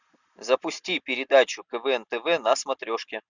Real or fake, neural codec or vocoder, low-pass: real; none; 7.2 kHz